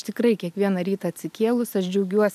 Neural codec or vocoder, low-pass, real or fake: none; 14.4 kHz; real